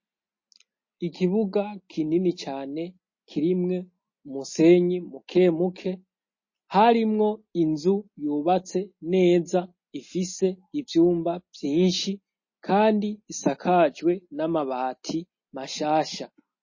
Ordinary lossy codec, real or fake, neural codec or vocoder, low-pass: MP3, 32 kbps; real; none; 7.2 kHz